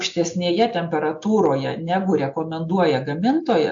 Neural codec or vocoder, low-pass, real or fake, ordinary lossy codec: none; 7.2 kHz; real; AAC, 64 kbps